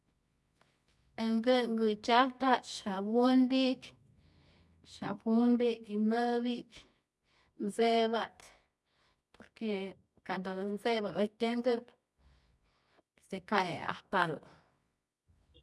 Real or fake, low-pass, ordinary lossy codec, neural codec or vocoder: fake; none; none; codec, 24 kHz, 0.9 kbps, WavTokenizer, medium music audio release